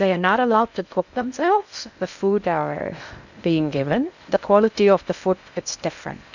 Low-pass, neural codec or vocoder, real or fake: 7.2 kHz; codec, 16 kHz in and 24 kHz out, 0.6 kbps, FocalCodec, streaming, 2048 codes; fake